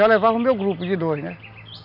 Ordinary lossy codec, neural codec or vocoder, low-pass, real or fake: none; none; 5.4 kHz; real